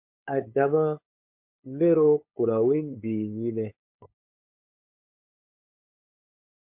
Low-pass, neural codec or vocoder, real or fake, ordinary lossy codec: 3.6 kHz; codec, 16 kHz, 8 kbps, FunCodec, trained on LibriTTS, 25 frames a second; fake; MP3, 32 kbps